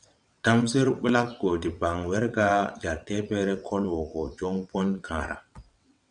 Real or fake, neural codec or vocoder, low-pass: fake; vocoder, 22.05 kHz, 80 mel bands, WaveNeXt; 9.9 kHz